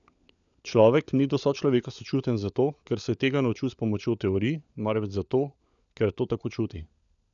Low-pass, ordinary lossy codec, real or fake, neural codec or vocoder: 7.2 kHz; none; fake; codec, 16 kHz, 16 kbps, FunCodec, trained on LibriTTS, 50 frames a second